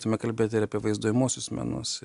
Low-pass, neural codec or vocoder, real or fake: 10.8 kHz; none; real